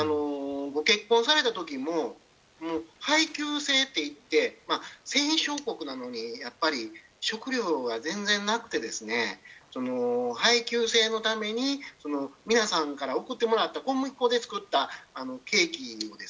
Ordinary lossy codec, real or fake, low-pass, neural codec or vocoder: none; real; none; none